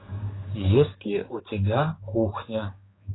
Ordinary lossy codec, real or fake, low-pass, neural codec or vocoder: AAC, 16 kbps; fake; 7.2 kHz; codec, 16 kHz, 4 kbps, X-Codec, HuBERT features, trained on general audio